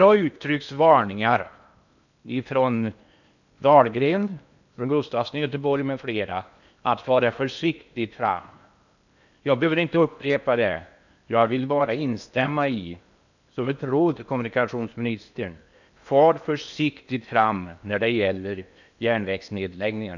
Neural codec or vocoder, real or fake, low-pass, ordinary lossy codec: codec, 16 kHz in and 24 kHz out, 0.8 kbps, FocalCodec, streaming, 65536 codes; fake; 7.2 kHz; none